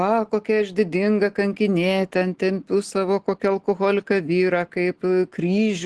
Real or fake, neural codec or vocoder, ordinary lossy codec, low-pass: real; none; Opus, 16 kbps; 10.8 kHz